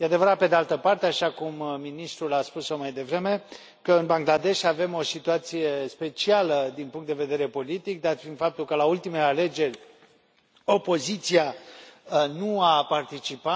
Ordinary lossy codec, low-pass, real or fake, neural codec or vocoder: none; none; real; none